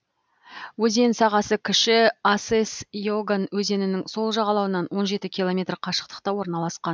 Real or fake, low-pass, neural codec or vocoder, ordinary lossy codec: real; none; none; none